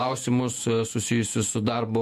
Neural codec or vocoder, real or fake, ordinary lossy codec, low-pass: vocoder, 48 kHz, 128 mel bands, Vocos; fake; MP3, 64 kbps; 14.4 kHz